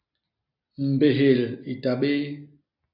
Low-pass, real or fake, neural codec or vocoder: 5.4 kHz; real; none